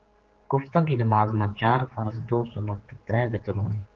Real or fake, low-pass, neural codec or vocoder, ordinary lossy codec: fake; 7.2 kHz; codec, 16 kHz, 4 kbps, X-Codec, HuBERT features, trained on general audio; Opus, 16 kbps